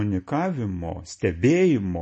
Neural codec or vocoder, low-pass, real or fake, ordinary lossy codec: none; 10.8 kHz; real; MP3, 32 kbps